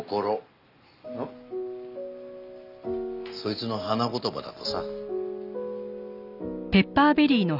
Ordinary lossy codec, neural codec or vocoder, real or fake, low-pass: none; none; real; 5.4 kHz